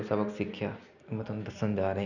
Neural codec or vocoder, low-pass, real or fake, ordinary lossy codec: none; 7.2 kHz; real; none